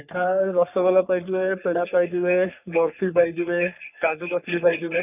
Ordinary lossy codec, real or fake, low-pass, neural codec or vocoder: none; fake; 3.6 kHz; codec, 44.1 kHz, 3.4 kbps, Pupu-Codec